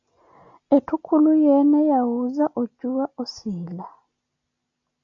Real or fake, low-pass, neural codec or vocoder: real; 7.2 kHz; none